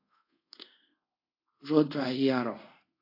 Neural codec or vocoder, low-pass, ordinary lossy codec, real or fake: codec, 24 kHz, 0.5 kbps, DualCodec; 5.4 kHz; AAC, 32 kbps; fake